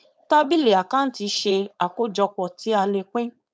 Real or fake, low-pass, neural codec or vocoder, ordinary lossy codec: fake; none; codec, 16 kHz, 4.8 kbps, FACodec; none